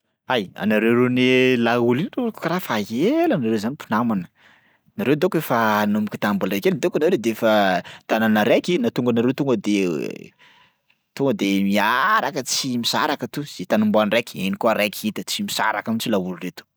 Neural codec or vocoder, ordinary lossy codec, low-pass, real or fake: none; none; none; real